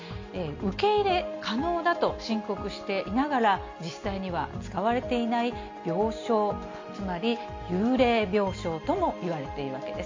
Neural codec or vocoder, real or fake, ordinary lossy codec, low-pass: none; real; MP3, 48 kbps; 7.2 kHz